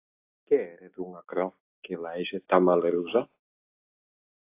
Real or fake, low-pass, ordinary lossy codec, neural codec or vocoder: real; 3.6 kHz; AAC, 24 kbps; none